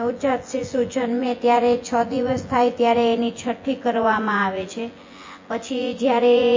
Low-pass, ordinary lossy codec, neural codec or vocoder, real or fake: 7.2 kHz; MP3, 32 kbps; vocoder, 24 kHz, 100 mel bands, Vocos; fake